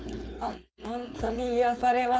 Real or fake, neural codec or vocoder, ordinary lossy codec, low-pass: fake; codec, 16 kHz, 4.8 kbps, FACodec; none; none